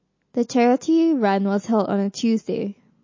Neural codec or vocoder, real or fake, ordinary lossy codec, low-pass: none; real; MP3, 32 kbps; 7.2 kHz